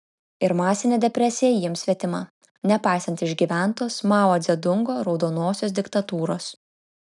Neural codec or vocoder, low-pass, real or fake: none; 10.8 kHz; real